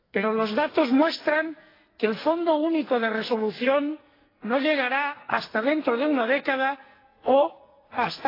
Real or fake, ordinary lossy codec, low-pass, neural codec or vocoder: fake; AAC, 24 kbps; 5.4 kHz; codec, 44.1 kHz, 2.6 kbps, SNAC